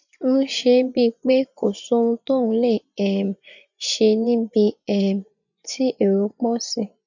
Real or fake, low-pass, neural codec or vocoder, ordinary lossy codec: fake; 7.2 kHz; vocoder, 24 kHz, 100 mel bands, Vocos; none